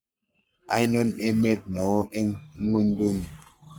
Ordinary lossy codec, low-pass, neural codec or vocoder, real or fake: none; none; codec, 44.1 kHz, 3.4 kbps, Pupu-Codec; fake